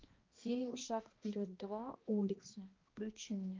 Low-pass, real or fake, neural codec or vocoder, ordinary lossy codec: 7.2 kHz; fake; codec, 16 kHz, 1 kbps, X-Codec, HuBERT features, trained on balanced general audio; Opus, 32 kbps